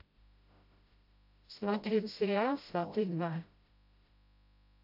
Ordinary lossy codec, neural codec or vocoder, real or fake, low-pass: AAC, 48 kbps; codec, 16 kHz, 0.5 kbps, FreqCodec, smaller model; fake; 5.4 kHz